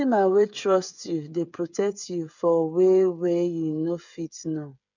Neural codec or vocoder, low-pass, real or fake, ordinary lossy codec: codec, 16 kHz, 8 kbps, FreqCodec, smaller model; 7.2 kHz; fake; none